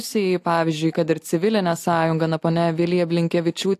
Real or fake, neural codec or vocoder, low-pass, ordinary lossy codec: real; none; 14.4 kHz; AAC, 64 kbps